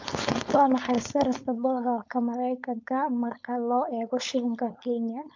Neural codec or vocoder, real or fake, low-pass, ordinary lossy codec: codec, 16 kHz, 4.8 kbps, FACodec; fake; 7.2 kHz; MP3, 64 kbps